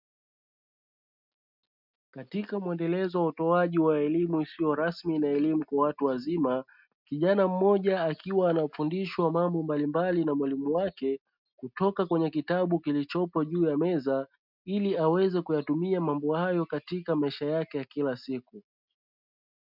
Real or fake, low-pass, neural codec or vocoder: real; 5.4 kHz; none